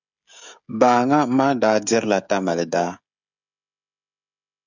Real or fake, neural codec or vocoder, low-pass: fake; codec, 16 kHz, 16 kbps, FreqCodec, smaller model; 7.2 kHz